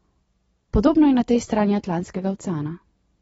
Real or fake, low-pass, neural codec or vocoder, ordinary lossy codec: real; 19.8 kHz; none; AAC, 24 kbps